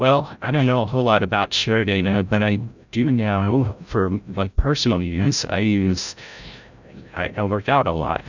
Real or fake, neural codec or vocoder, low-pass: fake; codec, 16 kHz, 0.5 kbps, FreqCodec, larger model; 7.2 kHz